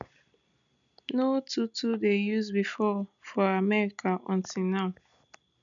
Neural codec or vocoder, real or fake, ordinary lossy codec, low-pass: none; real; none; 7.2 kHz